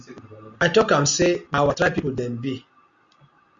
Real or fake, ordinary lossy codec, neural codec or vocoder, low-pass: real; Opus, 64 kbps; none; 7.2 kHz